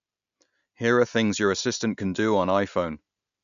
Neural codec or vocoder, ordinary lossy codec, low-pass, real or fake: none; none; 7.2 kHz; real